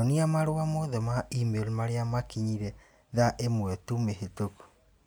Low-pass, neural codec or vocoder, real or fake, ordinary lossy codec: none; none; real; none